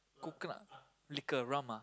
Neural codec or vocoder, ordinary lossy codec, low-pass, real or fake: none; none; none; real